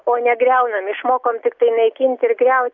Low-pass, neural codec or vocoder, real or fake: 7.2 kHz; none; real